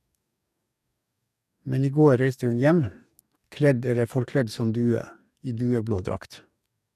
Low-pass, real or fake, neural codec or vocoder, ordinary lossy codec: 14.4 kHz; fake; codec, 44.1 kHz, 2.6 kbps, DAC; none